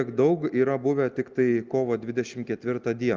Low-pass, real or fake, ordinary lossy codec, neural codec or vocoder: 7.2 kHz; real; Opus, 24 kbps; none